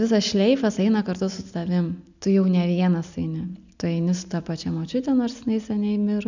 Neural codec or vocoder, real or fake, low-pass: none; real; 7.2 kHz